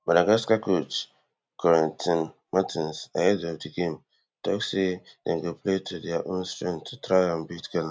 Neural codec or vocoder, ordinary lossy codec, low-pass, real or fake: none; none; none; real